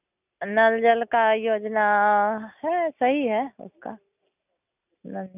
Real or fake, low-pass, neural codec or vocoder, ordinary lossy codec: real; 3.6 kHz; none; none